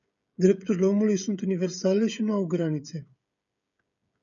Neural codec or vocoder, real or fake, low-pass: codec, 16 kHz, 16 kbps, FreqCodec, smaller model; fake; 7.2 kHz